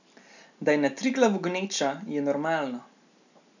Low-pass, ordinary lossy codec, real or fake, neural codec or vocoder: 7.2 kHz; none; real; none